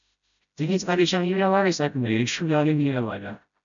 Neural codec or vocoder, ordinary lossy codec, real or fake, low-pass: codec, 16 kHz, 0.5 kbps, FreqCodec, smaller model; MP3, 96 kbps; fake; 7.2 kHz